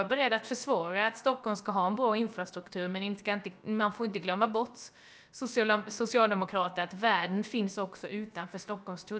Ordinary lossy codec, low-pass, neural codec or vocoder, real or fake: none; none; codec, 16 kHz, about 1 kbps, DyCAST, with the encoder's durations; fake